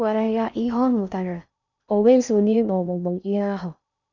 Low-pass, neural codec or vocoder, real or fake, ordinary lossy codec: 7.2 kHz; codec, 16 kHz in and 24 kHz out, 0.6 kbps, FocalCodec, streaming, 4096 codes; fake; none